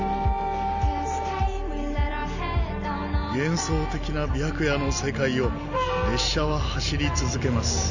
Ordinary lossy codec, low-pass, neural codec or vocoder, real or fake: none; 7.2 kHz; none; real